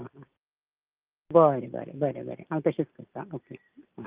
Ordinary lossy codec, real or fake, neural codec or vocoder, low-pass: Opus, 24 kbps; real; none; 3.6 kHz